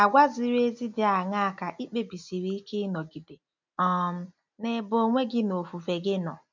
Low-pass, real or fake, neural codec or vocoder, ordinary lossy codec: 7.2 kHz; real; none; MP3, 64 kbps